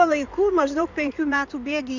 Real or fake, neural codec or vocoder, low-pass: fake; codec, 16 kHz in and 24 kHz out, 2.2 kbps, FireRedTTS-2 codec; 7.2 kHz